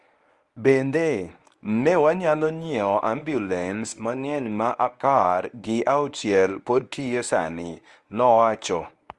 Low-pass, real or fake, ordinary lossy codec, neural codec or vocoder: 10.8 kHz; fake; Opus, 32 kbps; codec, 24 kHz, 0.9 kbps, WavTokenizer, medium speech release version 1